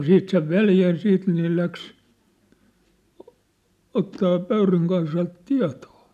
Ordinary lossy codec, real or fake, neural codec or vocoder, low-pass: none; real; none; 14.4 kHz